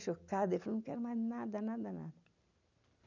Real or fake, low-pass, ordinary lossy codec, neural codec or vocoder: real; 7.2 kHz; none; none